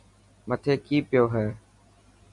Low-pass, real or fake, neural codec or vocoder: 10.8 kHz; real; none